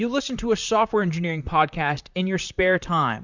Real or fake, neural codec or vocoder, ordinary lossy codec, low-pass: fake; vocoder, 44.1 kHz, 128 mel bands, Pupu-Vocoder; Opus, 64 kbps; 7.2 kHz